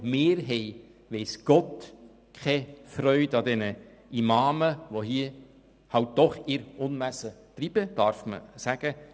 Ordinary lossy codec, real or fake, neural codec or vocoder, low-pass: none; real; none; none